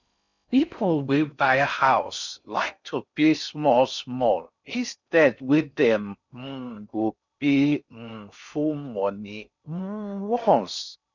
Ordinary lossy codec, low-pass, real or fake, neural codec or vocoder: none; 7.2 kHz; fake; codec, 16 kHz in and 24 kHz out, 0.6 kbps, FocalCodec, streaming, 4096 codes